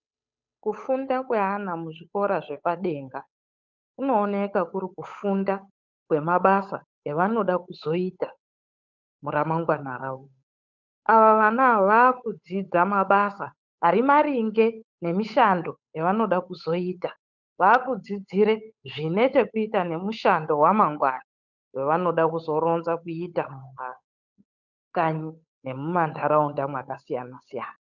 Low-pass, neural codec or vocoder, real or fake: 7.2 kHz; codec, 16 kHz, 8 kbps, FunCodec, trained on Chinese and English, 25 frames a second; fake